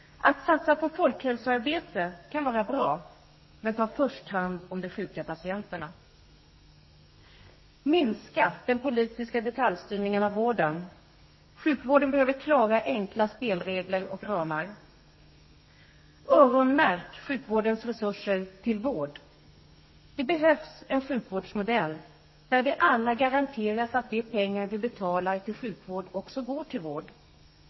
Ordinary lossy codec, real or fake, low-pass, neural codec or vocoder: MP3, 24 kbps; fake; 7.2 kHz; codec, 32 kHz, 1.9 kbps, SNAC